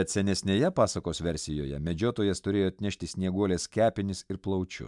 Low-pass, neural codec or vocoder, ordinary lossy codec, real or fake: 10.8 kHz; none; MP3, 96 kbps; real